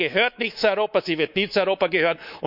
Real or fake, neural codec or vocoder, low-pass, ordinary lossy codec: fake; codec, 24 kHz, 3.1 kbps, DualCodec; 5.4 kHz; none